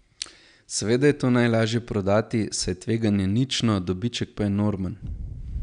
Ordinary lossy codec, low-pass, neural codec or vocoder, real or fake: none; 9.9 kHz; none; real